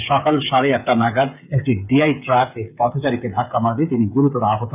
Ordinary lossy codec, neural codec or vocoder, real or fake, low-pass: none; codec, 16 kHz, 8 kbps, FreqCodec, smaller model; fake; 3.6 kHz